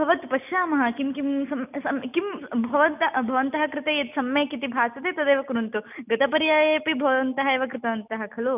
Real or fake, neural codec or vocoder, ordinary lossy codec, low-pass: real; none; none; 3.6 kHz